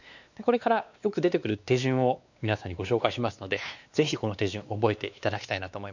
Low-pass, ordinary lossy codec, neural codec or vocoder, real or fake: 7.2 kHz; none; codec, 16 kHz, 2 kbps, X-Codec, WavLM features, trained on Multilingual LibriSpeech; fake